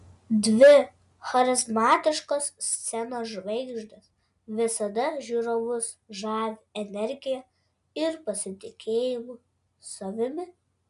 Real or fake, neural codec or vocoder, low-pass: real; none; 10.8 kHz